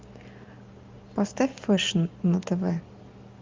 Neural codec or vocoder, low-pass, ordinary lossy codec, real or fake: none; 7.2 kHz; Opus, 32 kbps; real